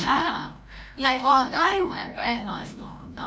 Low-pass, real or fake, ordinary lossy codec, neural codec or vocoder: none; fake; none; codec, 16 kHz, 0.5 kbps, FreqCodec, larger model